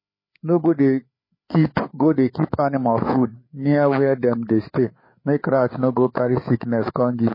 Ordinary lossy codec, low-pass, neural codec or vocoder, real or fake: MP3, 24 kbps; 5.4 kHz; codec, 16 kHz, 4 kbps, FreqCodec, larger model; fake